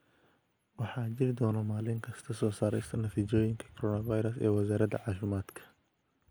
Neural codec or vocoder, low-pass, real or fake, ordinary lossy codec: none; none; real; none